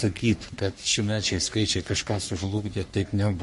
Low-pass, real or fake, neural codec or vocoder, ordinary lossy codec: 14.4 kHz; fake; codec, 44.1 kHz, 3.4 kbps, Pupu-Codec; MP3, 48 kbps